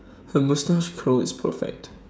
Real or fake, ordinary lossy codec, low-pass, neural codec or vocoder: fake; none; none; codec, 16 kHz, 16 kbps, FreqCodec, smaller model